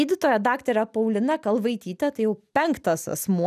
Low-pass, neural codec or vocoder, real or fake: 14.4 kHz; none; real